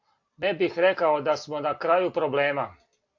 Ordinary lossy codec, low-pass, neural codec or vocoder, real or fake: AAC, 48 kbps; 7.2 kHz; none; real